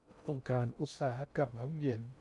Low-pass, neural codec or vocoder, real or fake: 10.8 kHz; codec, 16 kHz in and 24 kHz out, 0.6 kbps, FocalCodec, streaming, 2048 codes; fake